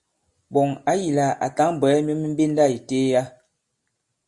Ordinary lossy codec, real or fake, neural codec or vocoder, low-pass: Opus, 64 kbps; real; none; 10.8 kHz